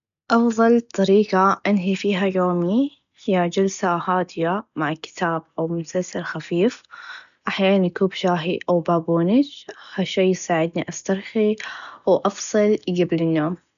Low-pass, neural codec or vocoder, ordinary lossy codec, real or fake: 7.2 kHz; none; none; real